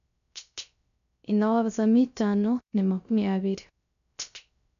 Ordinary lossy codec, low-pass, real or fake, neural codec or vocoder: none; 7.2 kHz; fake; codec, 16 kHz, 0.3 kbps, FocalCodec